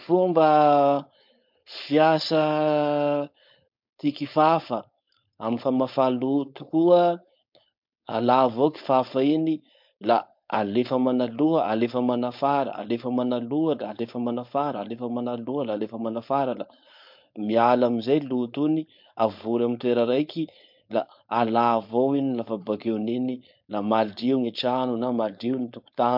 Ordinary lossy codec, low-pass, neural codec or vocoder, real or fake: MP3, 48 kbps; 5.4 kHz; codec, 16 kHz, 4.8 kbps, FACodec; fake